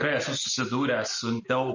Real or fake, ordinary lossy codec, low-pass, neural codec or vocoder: real; MP3, 32 kbps; 7.2 kHz; none